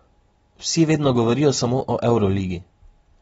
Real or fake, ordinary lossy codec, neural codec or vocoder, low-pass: real; AAC, 24 kbps; none; 19.8 kHz